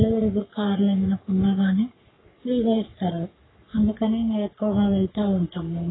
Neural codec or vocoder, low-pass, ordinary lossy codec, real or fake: codec, 44.1 kHz, 3.4 kbps, Pupu-Codec; 7.2 kHz; AAC, 16 kbps; fake